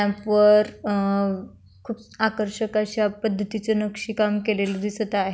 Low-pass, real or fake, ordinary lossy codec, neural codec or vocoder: none; real; none; none